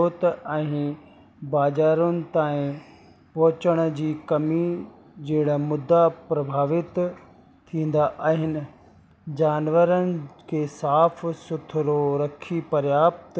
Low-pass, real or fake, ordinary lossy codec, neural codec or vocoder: none; real; none; none